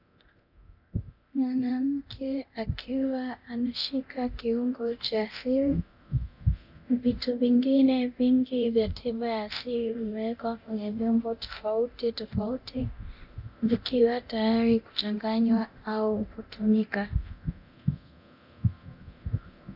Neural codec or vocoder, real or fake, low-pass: codec, 24 kHz, 0.9 kbps, DualCodec; fake; 5.4 kHz